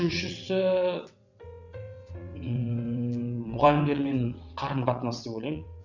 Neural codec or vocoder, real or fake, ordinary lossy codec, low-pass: vocoder, 22.05 kHz, 80 mel bands, WaveNeXt; fake; none; 7.2 kHz